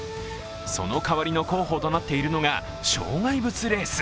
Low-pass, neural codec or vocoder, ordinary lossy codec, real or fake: none; none; none; real